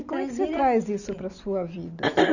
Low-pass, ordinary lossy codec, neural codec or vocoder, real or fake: 7.2 kHz; none; none; real